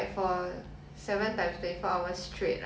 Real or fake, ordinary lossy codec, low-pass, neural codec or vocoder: real; none; none; none